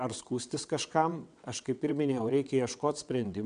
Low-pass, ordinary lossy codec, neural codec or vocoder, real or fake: 9.9 kHz; MP3, 96 kbps; vocoder, 22.05 kHz, 80 mel bands, WaveNeXt; fake